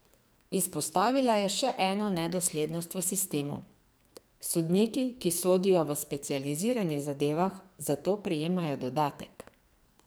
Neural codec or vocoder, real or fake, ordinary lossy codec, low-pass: codec, 44.1 kHz, 2.6 kbps, SNAC; fake; none; none